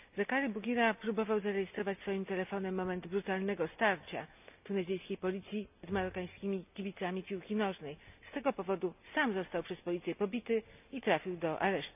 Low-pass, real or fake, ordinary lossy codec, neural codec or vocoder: 3.6 kHz; real; none; none